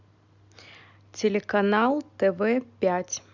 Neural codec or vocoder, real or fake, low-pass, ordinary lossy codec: none; real; 7.2 kHz; none